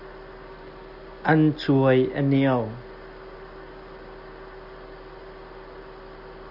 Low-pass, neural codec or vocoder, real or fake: 5.4 kHz; none; real